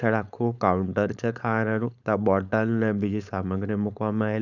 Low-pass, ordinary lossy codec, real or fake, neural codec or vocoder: 7.2 kHz; none; fake; codec, 16 kHz, 4.8 kbps, FACodec